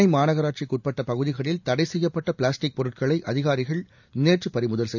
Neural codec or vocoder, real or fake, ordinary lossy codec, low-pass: none; real; none; 7.2 kHz